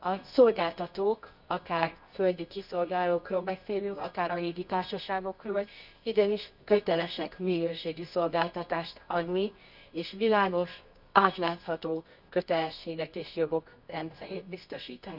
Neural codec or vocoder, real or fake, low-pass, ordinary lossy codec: codec, 24 kHz, 0.9 kbps, WavTokenizer, medium music audio release; fake; 5.4 kHz; none